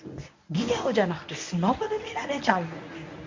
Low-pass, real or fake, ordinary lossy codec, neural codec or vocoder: 7.2 kHz; fake; MP3, 48 kbps; codec, 24 kHz, 0.9 kbps, WavTokenizer, medium speech release version 1